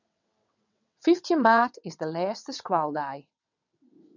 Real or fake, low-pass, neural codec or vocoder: fake; 7.2 kHz; vocoder, 22.05 kHz, 80 mel bands, WaveNeXt